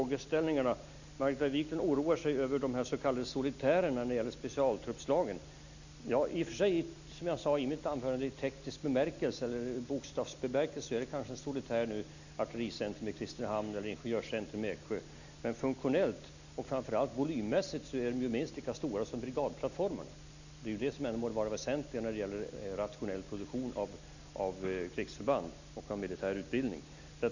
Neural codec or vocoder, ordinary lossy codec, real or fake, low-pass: none; none; real; 7.2 kHz